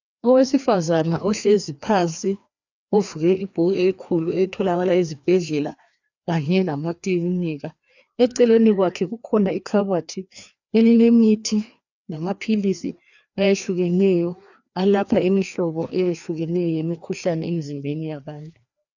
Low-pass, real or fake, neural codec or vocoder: 7.2 kHz; fake; codec, 16 kHz, 2 kbps, FreqCodec, larger model